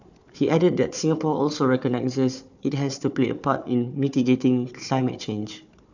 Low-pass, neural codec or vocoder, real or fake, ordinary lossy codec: 7.2 kHz; codec, 16 kHz, 4 kbps, FunCodec, trained on Chinese and English, 50 frames a second; fake; none